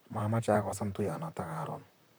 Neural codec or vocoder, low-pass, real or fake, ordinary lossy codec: vocoder, 44.1 kHz, 128 mel bands, Pupu-Vocoder; none; fake; none